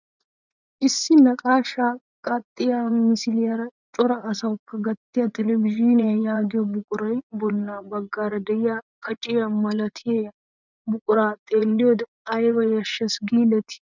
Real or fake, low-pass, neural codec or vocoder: fake; 7.2 kHz; vocoder, 24 kHz, 100 mel bands, Vocos